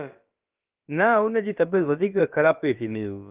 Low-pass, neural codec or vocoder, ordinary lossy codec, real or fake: 3.6 kHz; codec, 16 kHz, about 1 kbps, DyCAST, with the encoder's durations; Opus, 24 kbps; fake